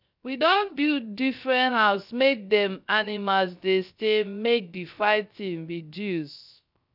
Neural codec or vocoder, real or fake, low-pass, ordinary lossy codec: codec, 16 kHz, 0.3 kbps, FocalCodec; fake; 5.4 kHz; none